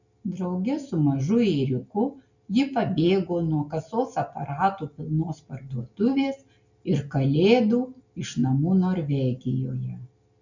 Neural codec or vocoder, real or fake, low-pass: none; real; 7.2 kHz